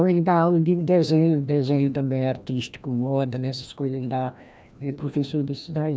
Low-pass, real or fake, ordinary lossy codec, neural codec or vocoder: none; fake; none; codec, 16 kHz, 1 kbps, FreqCodec, larger model